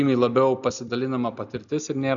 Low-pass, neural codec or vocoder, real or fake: 7.2 kHz; none; real